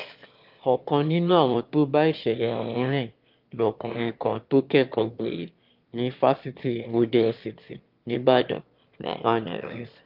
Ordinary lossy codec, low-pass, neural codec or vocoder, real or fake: Opus, 24 kbps; 5.4 kHz; autoencoder, 22.05 kHz, a latent of 192 numbers a frame, VITS, trained on one speaker; fake